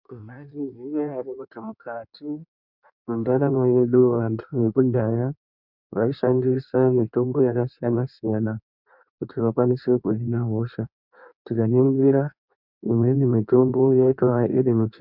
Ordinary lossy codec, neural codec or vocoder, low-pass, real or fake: MP3, 48 kbps; codec, 16 kHz in and 24 kHz out, 1.1 kbps, FireRedTTS-2 codec; 5.4 kHz; fake